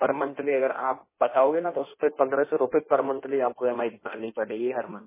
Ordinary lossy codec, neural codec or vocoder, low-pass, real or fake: MP3, 16 kbps; codec, 16 kHz in and 24 kHz out, 1.1 kbps, FireRedTTS-2 codec; 3.6 kHz; fake